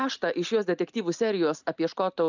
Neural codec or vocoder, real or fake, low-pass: none; real; 7.2 kHz